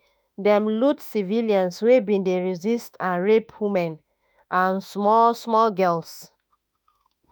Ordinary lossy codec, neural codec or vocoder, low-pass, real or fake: none; autoencoder, 48 kHz, 32 numbers a frame, DAC-VAE, trained on Japanese speech; none; fake